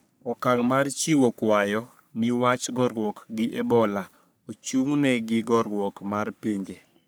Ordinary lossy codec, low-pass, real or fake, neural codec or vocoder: none; none; fake; codec, 44.1 kHz, 3.4 kbps, Pupu-Codec